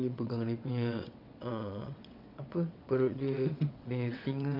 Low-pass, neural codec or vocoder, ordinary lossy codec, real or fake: 5.4 kHz; vocoder, 22.05 kHz, 80 mel bands, WaveNeXt; none; fake